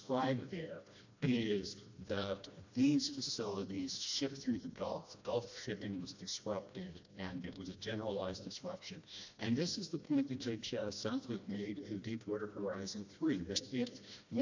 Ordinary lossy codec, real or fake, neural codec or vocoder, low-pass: AAC, 48 kbps; fake; codec, 16 kHz, 1 kbps, FreqCodec, smaller model; 7.2 kHz